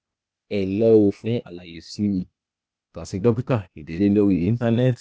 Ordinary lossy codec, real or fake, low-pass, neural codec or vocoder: none; fake; none; codec, 16 kHz, 0.8 kbps, ZipCodec